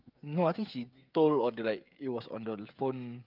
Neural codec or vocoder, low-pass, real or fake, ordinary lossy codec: codec, 16 kHz, 16 kbps, FreqCodec, smaller model; 5.4 kHz; fake; Opus, 24 kbps